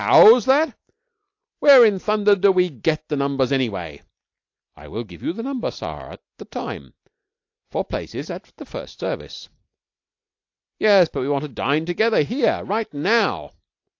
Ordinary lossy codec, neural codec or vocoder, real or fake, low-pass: AAC, 48 kbps; none; real; 7.2 kHz